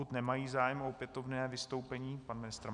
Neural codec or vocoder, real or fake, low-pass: none; real; 10.8 kHz